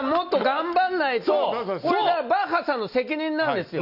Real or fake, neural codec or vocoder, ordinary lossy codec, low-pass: real; none; none; 5.4 kHz